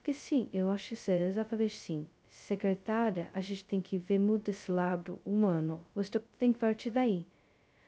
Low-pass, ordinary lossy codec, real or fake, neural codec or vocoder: none; none; fake; codec, 16 kHz, 0.2 kbps, FocalCodec